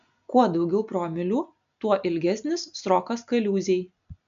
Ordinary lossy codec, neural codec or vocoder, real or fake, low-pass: MP3, 64 kbps; none; real; 7.2 kHz